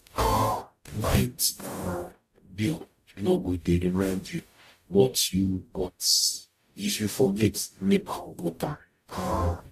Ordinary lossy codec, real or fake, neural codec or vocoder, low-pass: AAC, 96 kbps; fake; codec, 44.1 kHz, 0.9 kbps, DAC; 14.4 kHz